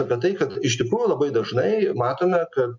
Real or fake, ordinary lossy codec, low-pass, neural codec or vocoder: real; MP3, 64 kbps; 7.2 kHz; none